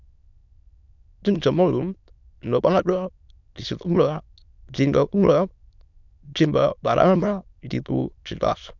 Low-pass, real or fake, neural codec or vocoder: 7.2 kHz; fake; autoencoder, 22.05 kHz, a latent of 192 numbers a frame, VITS, trained on many speakers